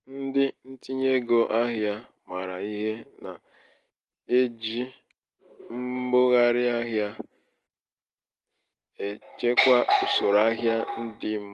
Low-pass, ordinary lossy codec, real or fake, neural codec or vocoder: 10.8 kHz; Opus, 24 kbps; real; none